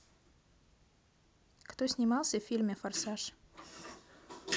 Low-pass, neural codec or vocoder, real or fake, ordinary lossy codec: none; none; real; none